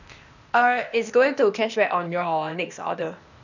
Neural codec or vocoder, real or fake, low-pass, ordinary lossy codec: codec, 16 kHz, 0.8 kbps, ZipCodec; fake; 7.2 kHz; none